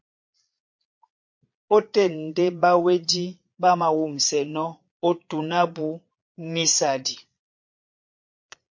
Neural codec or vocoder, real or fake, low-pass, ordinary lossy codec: vocoder, 22.05 kHz, 80 mel bands, Vocos; fake; 7.2 kHz; MP3, 48 kbps